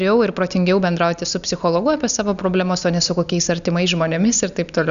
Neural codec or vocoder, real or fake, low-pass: none; real; 7.2 kHz